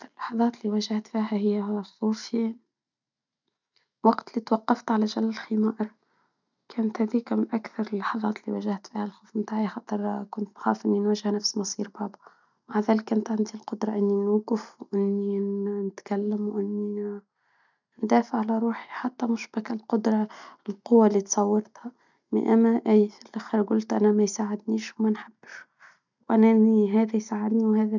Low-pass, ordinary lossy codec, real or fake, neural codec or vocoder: 7.2 kHz; none; real; none